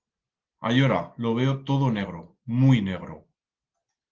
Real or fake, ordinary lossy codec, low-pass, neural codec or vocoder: real; Opus, 16 kbps; 7.2 kHz; none